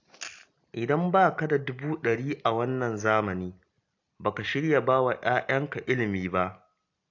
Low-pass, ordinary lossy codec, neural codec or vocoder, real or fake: 7.2 kHz; AAC, 48 kbps; none; real